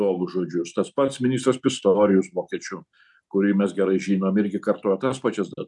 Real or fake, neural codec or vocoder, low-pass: real; none; 10.8 kHz